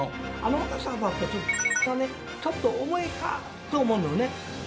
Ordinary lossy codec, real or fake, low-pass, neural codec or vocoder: none; real; none; none